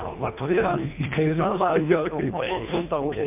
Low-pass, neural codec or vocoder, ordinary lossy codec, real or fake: 3.6 kHz; codec, 24 kHz, 3 kbps, HILCodec; MP3, 32 kbps; fake